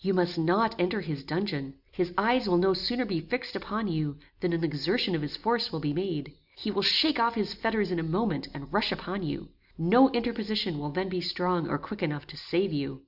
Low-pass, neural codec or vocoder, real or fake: 5.4 kHz; none; real